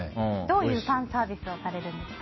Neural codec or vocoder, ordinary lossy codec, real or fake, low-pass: none; MP3, 24 kbps; real; 7.2 kHz